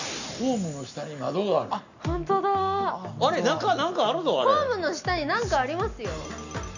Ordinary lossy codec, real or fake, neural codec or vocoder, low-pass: none; real; none; 7.2 kHz